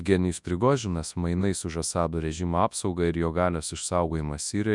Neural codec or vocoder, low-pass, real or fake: codec, 24 kHz, 0.9 kbps, WavTokenizer, large speech release; 10.8 kHz; fake